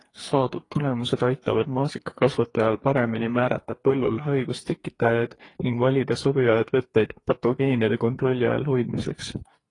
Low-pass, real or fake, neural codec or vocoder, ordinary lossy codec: 10.8 kHz; fake; codec, 44.1 kHz, 2.6 kbps, SNAC; AAC, 32 kbps